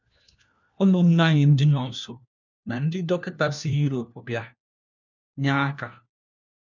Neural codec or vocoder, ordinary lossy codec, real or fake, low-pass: codec, 16 kHz, 1 kbps, FunCodec, trained on LibriTTS, 50 frames a second; none; fake; 7.2 kHz